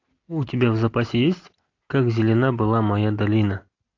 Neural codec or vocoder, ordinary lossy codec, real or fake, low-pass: none; AAC, 48 kbps; real; 7.2 kHz